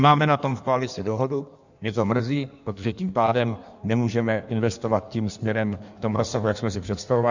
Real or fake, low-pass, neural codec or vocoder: fake; 7.2 kHz; codec, 16 kHz in and 24 kHz out, 1.1 kbps, FireRedTTS-2 codec